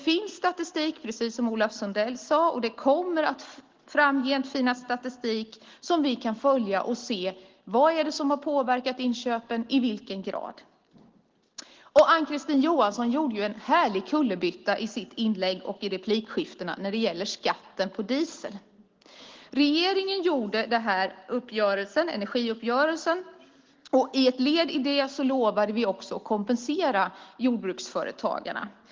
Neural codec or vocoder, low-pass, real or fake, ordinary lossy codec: none; 7.2 kHz; real; Opus, 16 kbps